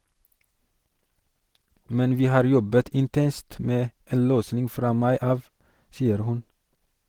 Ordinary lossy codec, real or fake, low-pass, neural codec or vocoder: Opus, 16 kbps; real; 19.8 kHz; none